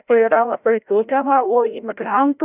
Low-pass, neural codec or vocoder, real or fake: 3.6 kHz; codec, 16 kHz, 0.5 kbps, FreqCodec, larger model; fake